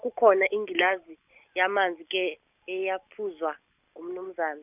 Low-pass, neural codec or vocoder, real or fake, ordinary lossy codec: 3.6 kHz; none; real; none